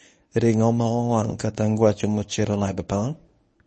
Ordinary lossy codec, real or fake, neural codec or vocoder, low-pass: MP3, 32 kbps; fake; codec, 24 kHz, 0.9 kbps, WavTokenizer, medium speech release version 1; 10.8 kHz